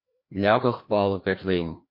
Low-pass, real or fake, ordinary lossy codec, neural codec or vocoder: 5.4 kHz; fake; MP3, 32 kbps; codec, 16 kHz, 1 kbps, FreqCodec, larger model